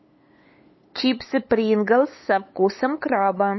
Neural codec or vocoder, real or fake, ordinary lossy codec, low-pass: codec, 16 kHz, 8 kbps, FunCodec, trained on LibriTTS, 25 frames a second; fake; MP3, 24 kbps; 7.2 kHz